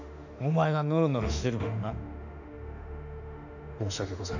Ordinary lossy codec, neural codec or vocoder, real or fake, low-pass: none; autoencoder, 48 kHz, 32 numbers a frame, DAC-VAE, trained on Japanese speech; fake; 7.2 kHz